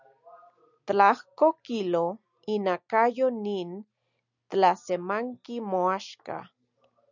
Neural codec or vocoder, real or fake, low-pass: none; real; 7.2 kHz